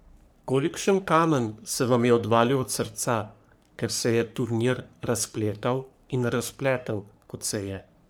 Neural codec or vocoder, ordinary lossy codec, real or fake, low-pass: codec, 44.1 kHz, 3.4 kbps, Pupu-Codec; none; fake; none